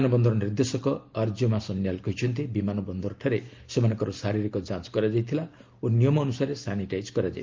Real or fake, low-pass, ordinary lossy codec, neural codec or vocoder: real; 7.2 kHz; Opus, 32 kbps; none